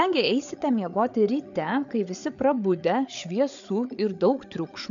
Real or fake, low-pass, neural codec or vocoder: fake; 7.2 kHz; codec, 16 kHz, 8 kbps, FreqCodec, larger model